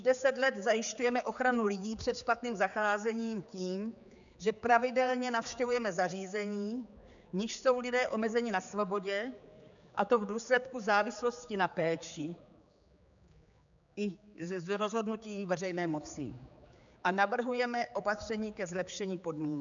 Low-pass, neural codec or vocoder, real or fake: 7.2 kHz; codec, 16 kHz, 4 kbps, X-Codec, HuBERT features, trained on general audio; fake